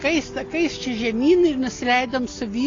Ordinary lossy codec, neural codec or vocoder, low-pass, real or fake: AAC, 32 kbps; none; 7.2 kHz; real